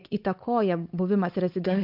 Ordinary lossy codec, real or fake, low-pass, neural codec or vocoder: MP3, 48 kbps; fake; 5.4 kHz; codec, 16 kHz, 8 kbps, FunCodec, trained on Chinese and English, 25 frames a second